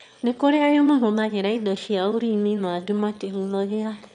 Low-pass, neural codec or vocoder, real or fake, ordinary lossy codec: 9.9 kHz; autoencoder, 22.05 kHz, a latent of 192 numbers a frame, VITS, trained on one speaker; fake; MP3, 96 kbps